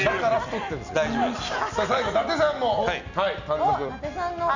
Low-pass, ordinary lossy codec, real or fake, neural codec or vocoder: 7.2 kHz; none; real; none